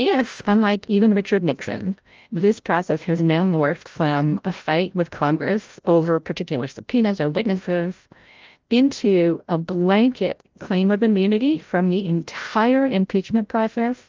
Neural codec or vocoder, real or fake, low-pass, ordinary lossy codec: codec, 16 kHz, 0.5 kbps, FreqCodec, larger model; fake; 7.2 kHz; Opus, 24 kbps